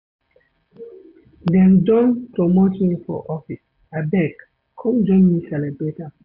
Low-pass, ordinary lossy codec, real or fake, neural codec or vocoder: 5.4 kHz; AAC, 48 kbps; real; none